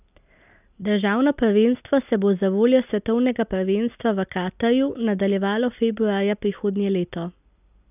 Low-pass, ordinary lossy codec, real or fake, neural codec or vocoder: 3.6 kHz; none; real; none